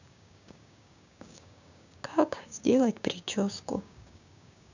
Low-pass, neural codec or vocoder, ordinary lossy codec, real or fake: 7.2 kHz; codec, 16 kHz, 6 kbps, DAC; none; fake